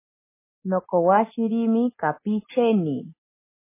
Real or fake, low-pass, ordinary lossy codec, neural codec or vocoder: real; 3.6 kHz; MP3, 16 kbps; none